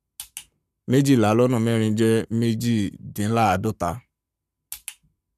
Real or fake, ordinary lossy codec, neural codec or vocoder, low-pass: fake; none; codec, 44.1 kHz, 7.8 kbps, Pupu-Codec; 14.4 kHz